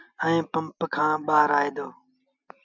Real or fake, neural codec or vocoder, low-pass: real; none; 7.2 kHz